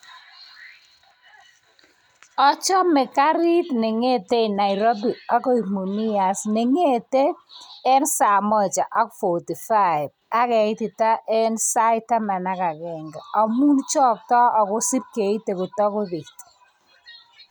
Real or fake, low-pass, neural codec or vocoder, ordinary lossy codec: real; none; none; none